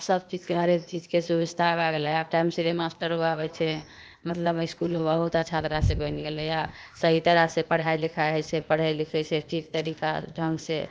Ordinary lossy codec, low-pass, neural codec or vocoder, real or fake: none; none; codec, 16 kHz, 0.8 kbps, ZipCodec; fake